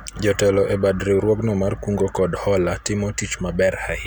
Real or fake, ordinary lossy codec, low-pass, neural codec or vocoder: real; none; 19.8 kHz; none